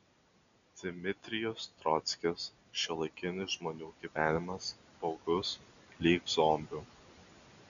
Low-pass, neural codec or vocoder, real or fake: 7.2 kHz; none; real